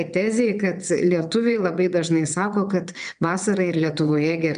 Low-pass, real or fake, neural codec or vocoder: 9.9 kHz; fake; vocoder, 22.05 kHz, 80 mel bands, WaveNeXt